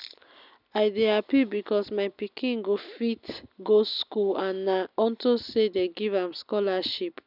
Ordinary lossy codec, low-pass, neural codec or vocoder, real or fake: none; 5.4 kHz; none; real